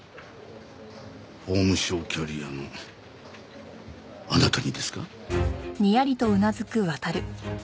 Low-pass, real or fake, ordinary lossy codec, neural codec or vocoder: none; real; none; none